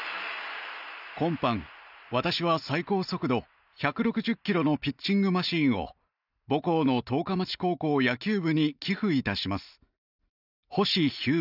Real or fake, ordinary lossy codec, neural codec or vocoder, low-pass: real; none; none; 5.4 kHz